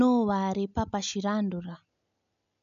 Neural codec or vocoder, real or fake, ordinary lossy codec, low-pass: none; real; none; 7.2 kHz